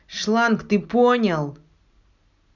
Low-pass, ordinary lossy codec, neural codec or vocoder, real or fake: 7.2 kHz; none; none; real